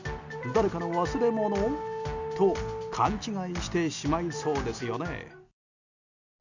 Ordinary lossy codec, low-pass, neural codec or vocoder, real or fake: none; 7.2 kHz; none; real